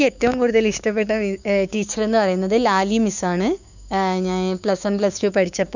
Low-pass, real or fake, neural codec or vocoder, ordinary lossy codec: 7.2 kHz; fake; codec, 16 kHz, 6 kbps, DAC; none